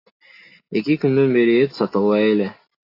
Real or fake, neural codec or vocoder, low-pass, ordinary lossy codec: real; none; 5.4 kHz; AAC, 32 kbps